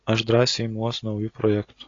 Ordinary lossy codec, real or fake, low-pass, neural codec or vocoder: AAC, 32 kbps; real; 7.2 kHz; none